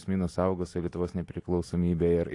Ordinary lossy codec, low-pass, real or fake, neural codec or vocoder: AAC, 48 kbps; 10.8 kHz; real; none